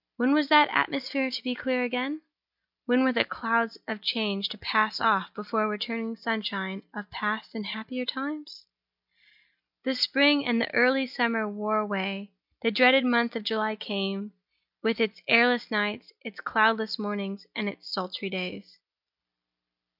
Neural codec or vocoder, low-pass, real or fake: none; 5.4 kHz; real